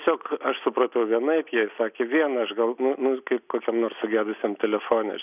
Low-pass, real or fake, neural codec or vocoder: 3.6 kHz; real; none